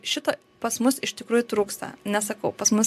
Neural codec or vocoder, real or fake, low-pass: none; real; 14.4 kHz